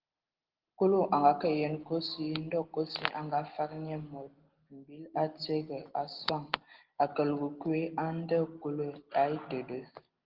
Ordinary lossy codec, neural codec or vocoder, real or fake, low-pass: Opus, 16 kbps; none; real; 5.4 kHz